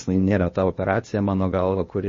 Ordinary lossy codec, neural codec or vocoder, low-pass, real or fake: MP3, 32 kbps; codec, 16 kHz, 0.8 kbps, ZipCodec; 7.2 kHz; fake